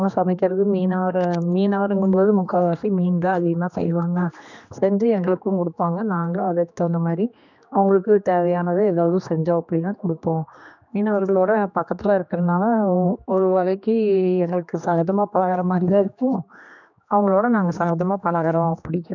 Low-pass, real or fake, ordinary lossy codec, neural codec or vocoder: 7.2 kHz; fake; none; codec, 16 kHz, 2 kbps, X-Codec, HuBERT features, trained on general audio